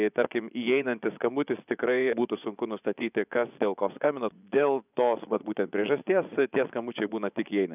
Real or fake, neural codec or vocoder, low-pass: fake; vocoder, 24 kHz, 100 mel bands, Vocos; 3.6 kHz